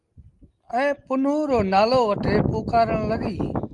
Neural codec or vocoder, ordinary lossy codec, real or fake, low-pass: none; Opus, 32 kbps; real; 10.8 kHz